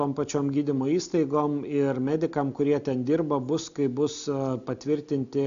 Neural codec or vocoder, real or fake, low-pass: none; real; 7.2 kHz